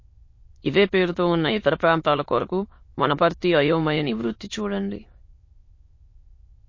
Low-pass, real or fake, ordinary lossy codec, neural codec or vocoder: 7.2 kHz; fake; MP3, 32 kbps; autoencoder, 22.05 kHz, a latent of 192 numbers a frame, VITS, trained on many speakers